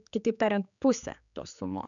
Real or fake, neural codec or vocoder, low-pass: fake; codec, 16 kHz, 4 kbps, X-Codec, HuBERT features, trained on general audio; 7.2 kHz